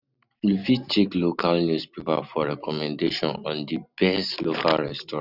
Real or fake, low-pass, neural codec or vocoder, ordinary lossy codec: real; 5.4 kHz; none; none